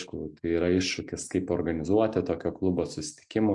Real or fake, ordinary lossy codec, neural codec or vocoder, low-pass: real; MP3, 64 kbps; none; 10.8 kHz